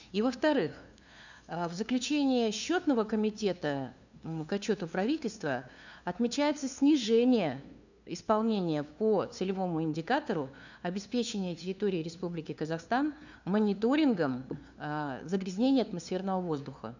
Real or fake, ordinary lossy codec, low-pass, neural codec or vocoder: fake; none; 7.2 kHz; codec, 16 kHz, 2 kbps, FunCodec, trained on LibriTTS, 25 frames a second